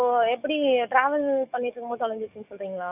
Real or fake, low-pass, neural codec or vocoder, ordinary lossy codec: fake; 3.6 kHz; codec, 44.1 kHz, 7.8 kbps, DAC; none